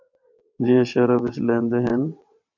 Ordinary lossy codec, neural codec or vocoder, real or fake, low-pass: MP3, 64 kbps; vocoder, 22.05 kHz, 80 mel bands, WaveNeXt; fake; 7.2 kHz